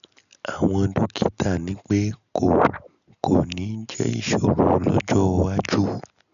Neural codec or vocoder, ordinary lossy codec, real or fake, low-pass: none; none; real; 7.2 kHz